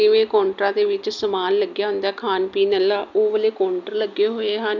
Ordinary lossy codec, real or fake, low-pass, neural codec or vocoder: none; real; 7.2 kHz; none